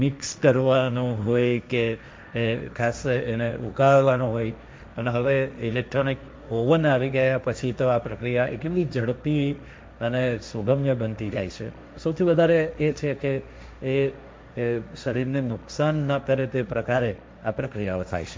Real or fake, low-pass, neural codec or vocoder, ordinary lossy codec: fake; none; codec, 16 kHz, 1.1 kbps, Voila-Tokenizer; none